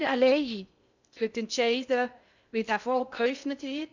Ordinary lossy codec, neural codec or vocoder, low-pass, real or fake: none; codec, 16 kHz in and 24 kHz out, 0.6 kbps, FocalCodec, streaming, 2048 codes; 7.2 kHz; fake